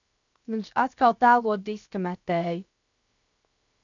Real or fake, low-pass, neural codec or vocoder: fake; 7.2 kHz; codec, 16 kHz, 0.7 kbps, FocalCodec